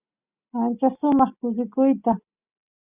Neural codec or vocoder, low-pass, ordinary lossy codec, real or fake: none; 3.6 kHz; Opus, 64 kbps; real